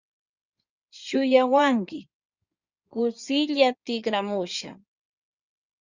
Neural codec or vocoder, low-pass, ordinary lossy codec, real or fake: codec, 16 kHz in and 24 kHz out, 2.2 kbps, FireRedTTS-2 codec; 7.2 kHz; Opus, 64 kbps; fake